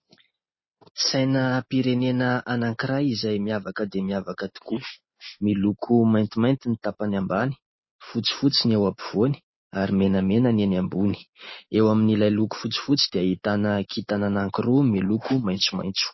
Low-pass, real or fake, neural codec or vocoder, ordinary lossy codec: 7.2 kHz; real; none; MP3, 24 kbps